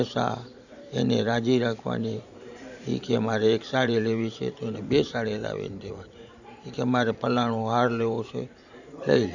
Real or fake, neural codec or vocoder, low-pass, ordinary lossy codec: fake; vocoder, 44.1 kHz, 128 mel bands every 512 samples, BigVGAN v2; 7.2 kHz; none